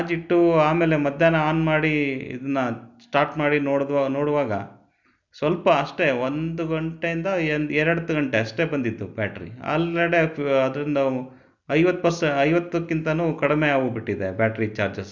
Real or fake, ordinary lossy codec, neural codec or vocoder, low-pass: real; none; none; 7.2 kHz